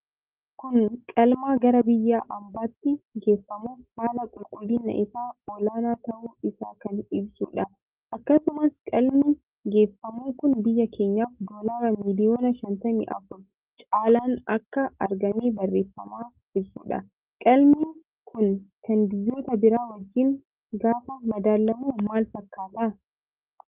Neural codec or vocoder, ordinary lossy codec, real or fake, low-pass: none; Opus, 32 kbps; real; 3.6 kHz